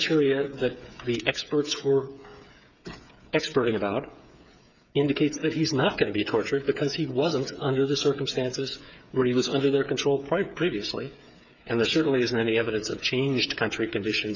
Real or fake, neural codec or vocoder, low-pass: fake; codec, 16 kHz, 8 kbps, FreqCodec, smaller model; 7.2 kHz